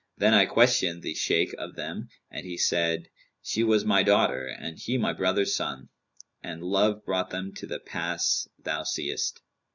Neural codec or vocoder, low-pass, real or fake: none; 7.2 kHz; real